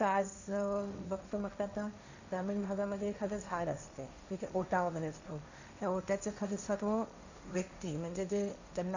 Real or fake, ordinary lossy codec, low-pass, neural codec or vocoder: fake; none; 7.2 kHz; codec, 16 kHz, 1.1 kbps, Voila-Tokenizer